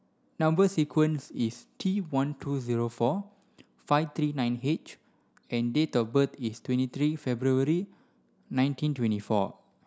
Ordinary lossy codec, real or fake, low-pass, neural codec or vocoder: none; real; none; none